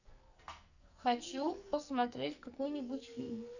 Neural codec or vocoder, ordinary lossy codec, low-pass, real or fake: codec, 44.1 kHz, 2.6 kbps, SNAC; none; 7.2 kHz; fake